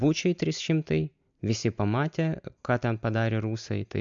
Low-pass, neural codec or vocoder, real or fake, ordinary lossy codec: 7.2 kHz; none; real; MP3, 64 kbps